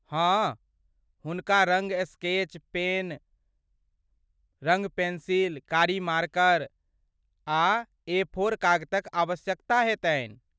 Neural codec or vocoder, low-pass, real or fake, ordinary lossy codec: none; none; real; none